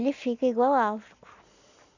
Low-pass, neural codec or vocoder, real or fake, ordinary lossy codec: 7.2 kHz; none; real; none